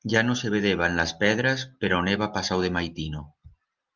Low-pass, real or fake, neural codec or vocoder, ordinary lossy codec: 7.2 kHz; real; none; Opus, 32 kbps